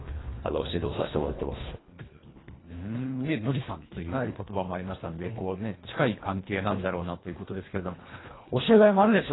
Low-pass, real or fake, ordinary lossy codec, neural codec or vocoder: 7.2 kHz; fake; AAC, 16 kbps; codec, 24 kHz, 1.5 kbps, HILCodec